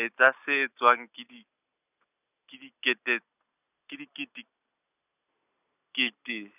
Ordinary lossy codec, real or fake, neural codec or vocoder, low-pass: none; real; none; 3.6 kHz